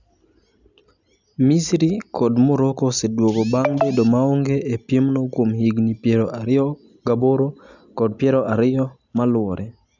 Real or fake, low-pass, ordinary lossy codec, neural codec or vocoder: real; 7.2 kHz; none; none